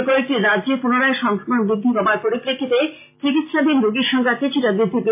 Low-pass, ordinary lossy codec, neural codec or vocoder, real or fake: 3.6 kHz; MP3, 24 kbps; none; real